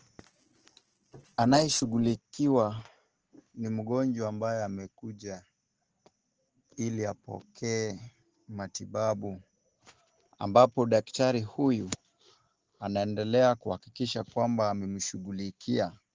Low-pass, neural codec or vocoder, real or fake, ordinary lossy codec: 7.2 kHz; none; real; Opus, 16 kbps